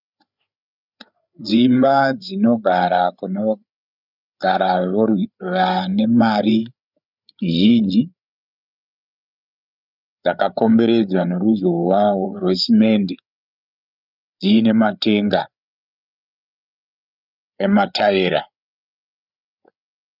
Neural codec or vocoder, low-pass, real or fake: codec, 16 kHz, 8 kbps, FreqCodec, larger model; 5.4 kHz; fake